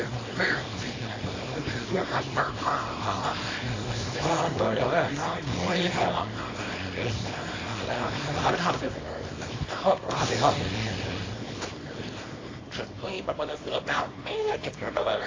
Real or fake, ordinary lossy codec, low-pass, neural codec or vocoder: fake; AAC, 32 kbps; 7.2 kHz; codec, 24 kHz, 0.9 kbps, WavTokenizer, small release